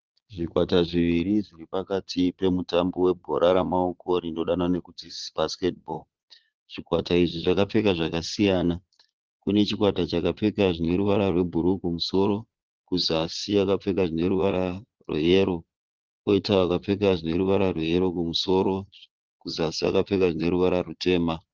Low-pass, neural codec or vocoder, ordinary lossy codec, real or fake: 7.2 kHz; vocoder, 22.05 kHz, 80 mel bands, Vocos; Opus, 16 kbps; fake